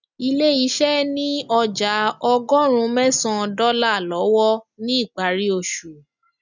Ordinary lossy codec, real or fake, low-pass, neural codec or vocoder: none; real; 7.2 kHz; none